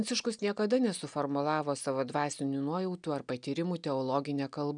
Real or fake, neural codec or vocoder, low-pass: real; none; 9.9 kHz